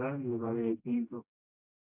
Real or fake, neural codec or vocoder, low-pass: fake; codec, 16 kHz, 1 kbps, FreqCodec, smaller model; 3.6 kHz